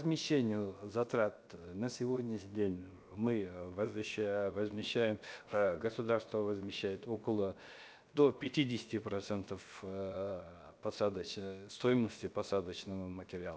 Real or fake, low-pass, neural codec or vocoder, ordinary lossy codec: fake; none; codec, 16 kHz, 0.7 kbps, FocalCodec; none